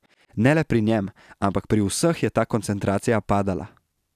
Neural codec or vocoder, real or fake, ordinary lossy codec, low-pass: none; real; none; 14.4 kHz